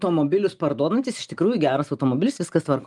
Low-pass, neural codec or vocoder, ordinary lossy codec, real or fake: 10.8 kHz; none; Opus, 32 kbps; real